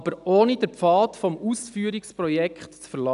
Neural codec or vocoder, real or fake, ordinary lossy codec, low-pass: none; real; none; 10.8 kHz